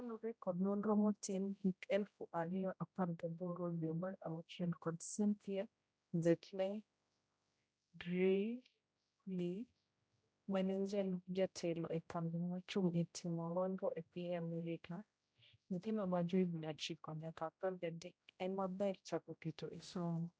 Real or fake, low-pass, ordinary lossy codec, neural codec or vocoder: fake; none; none; codec, 16 kHz, 0.5 kbps, X-Codec, HuBERT features, trained on general audio